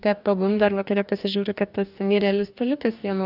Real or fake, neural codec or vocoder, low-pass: fake; codec, 44.1 kHz, 2.6 kbps, DAC; 5.4 kHz